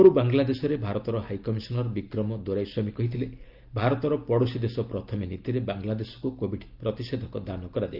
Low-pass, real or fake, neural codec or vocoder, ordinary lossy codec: 5.4 kHz; real; none; Opus, 32 kbps